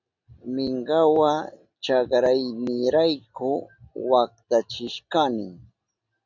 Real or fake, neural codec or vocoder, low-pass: real; none; 7.2 kHz